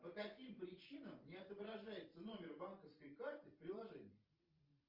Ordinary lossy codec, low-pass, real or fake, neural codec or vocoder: Opus, 24 kbps; 5.4 kHz; fake; vocoder, 44.1 kHz, 128 mel bands every 512 samples, BigVGAN v2